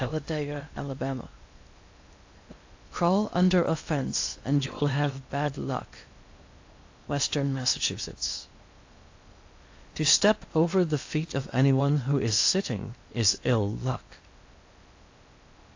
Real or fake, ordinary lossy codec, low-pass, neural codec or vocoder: fake; AAC, 48 kbps; 7.2 kHz; codec, 16 kHz in and 24 kHz out, 0.8 kbps, FocalCodec, streaming, 65536 codes